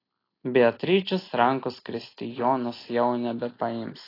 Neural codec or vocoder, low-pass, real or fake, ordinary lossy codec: none; 5.4 kHz; real; AAC, 24 kbps